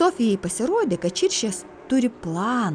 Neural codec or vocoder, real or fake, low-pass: none; real; 9.9 kHz